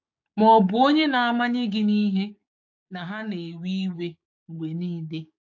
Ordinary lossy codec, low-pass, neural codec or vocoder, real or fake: AAC, 48 kbps; 7.2 kHz; codec, 16 kHz, 6 kbps, DAC; fake